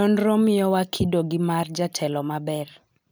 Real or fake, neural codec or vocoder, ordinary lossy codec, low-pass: real; none; none; none